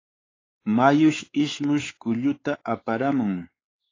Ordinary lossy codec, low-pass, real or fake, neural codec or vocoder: AAC, 32 kbps; 7.2 kHz; fake; codec, 16 kHz, 4 kbps, X-Codec, WavLM features, trained on Multilingual LibriSpeech